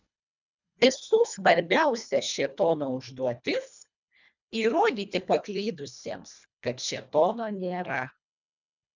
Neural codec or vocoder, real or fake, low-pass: codec, 24 kHz, 1.5 kbps, HILCodec; fake; 7.2 kHz